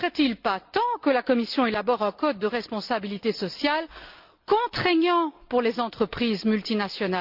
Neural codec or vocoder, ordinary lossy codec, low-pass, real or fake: none; Opus, 24 kbps; 5.4 kHz; real